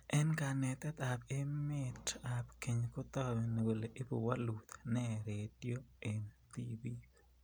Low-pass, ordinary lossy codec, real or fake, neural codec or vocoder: none; none; real; none